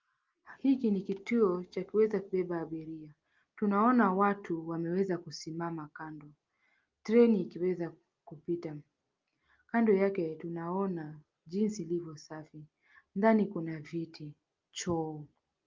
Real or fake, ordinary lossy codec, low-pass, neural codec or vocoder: real; Opus, 24 kbps; 7.2 kHz; none